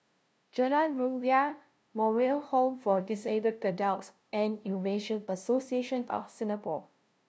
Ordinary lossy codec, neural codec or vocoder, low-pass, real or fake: none; codec, 16 kHz, 0.5 kbps, FunCodec, trained on LibriTTS, 25 frames a second; none; fake